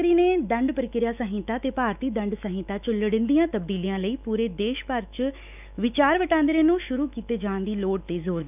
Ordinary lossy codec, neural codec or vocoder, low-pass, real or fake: none; autoencoder, 48 kHz, 128 numbers a frame, DAC-VAE, trained on Japanese speech; 3.6 kHz; fake